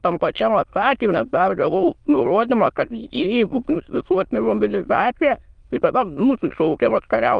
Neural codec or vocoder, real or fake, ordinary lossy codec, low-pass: autoencoder, 22.05 kHz, a latent of 192 numbers a frame, VITS, trained on many speakers; fake; Opus, 32 kbps; 9.9 kHz